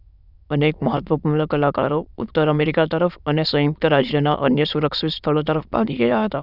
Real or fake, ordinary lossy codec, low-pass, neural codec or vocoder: fake; none; 5.4 kHz; autoencoder, 22.05 kHz, a latent of 192 numbers a frame, VITS, trained on many speakers